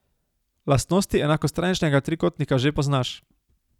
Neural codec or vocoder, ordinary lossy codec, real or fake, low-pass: none; none; real; 19.8 kHz